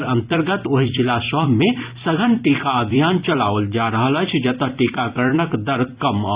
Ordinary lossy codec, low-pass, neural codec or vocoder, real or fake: Opus, 64 kbps; 3.6 kHz; vocoder, 44.1 kHz, 128 mel bands every 256 samples, BigVGAN v2; fake